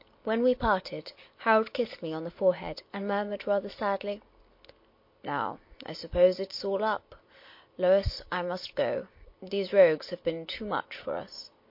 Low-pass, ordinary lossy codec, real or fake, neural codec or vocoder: 5.4 kHz; MP3, 32 kbps; real; none